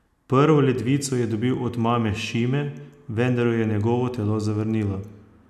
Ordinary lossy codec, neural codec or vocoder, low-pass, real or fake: none; none; 14.4 kHz; real